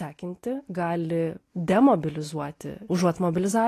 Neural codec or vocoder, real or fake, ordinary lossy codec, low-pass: none; real; AAC, 48 kbps; 14.4 kHz